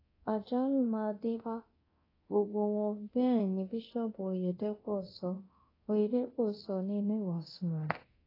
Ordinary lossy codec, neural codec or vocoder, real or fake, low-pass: AAC, 24 kbps; codec, 24 kHz, 0.9 kbps, DualCodec; fake; 5.4 kHz